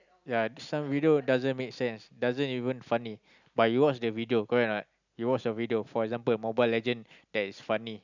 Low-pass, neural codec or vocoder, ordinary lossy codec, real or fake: 7.2 kHz; none; none; real